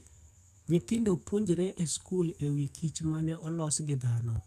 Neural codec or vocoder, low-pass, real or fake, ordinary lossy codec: codec, 44.1 kHz, 2.6 kbps, SNAC; 14.4 kHz; fake; none